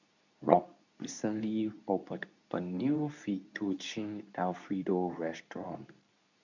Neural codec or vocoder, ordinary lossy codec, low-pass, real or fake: codec, 24 kHz, 0.9 kbps, WavTokenizer, medium speech release version 2; none; 7.2 kHz; fake